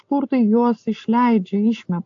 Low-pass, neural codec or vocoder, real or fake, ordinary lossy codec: 7.2 kHz; none; real; AAC, 64 kbps